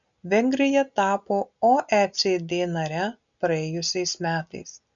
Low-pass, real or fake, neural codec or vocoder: 7.2 kHz; real; none